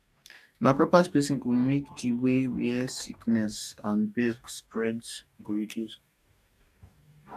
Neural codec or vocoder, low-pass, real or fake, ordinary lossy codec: codec, 44.1 kHz, 2.6 kbps, DAC; 14.4 kHz; fake; none